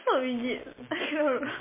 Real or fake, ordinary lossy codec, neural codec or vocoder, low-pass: real; MP3, 16 kbps; none; 3.6 kHz